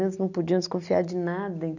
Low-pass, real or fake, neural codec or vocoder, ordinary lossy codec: 7.2 kHz; real; none; none